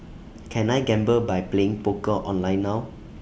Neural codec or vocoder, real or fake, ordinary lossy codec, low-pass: none; real; none; none